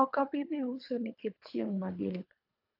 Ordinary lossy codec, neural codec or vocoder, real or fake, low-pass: AAC, 48 kbps; codec, 24 kHz, 3 kbps, HILCodec; fake; 5.4 kHz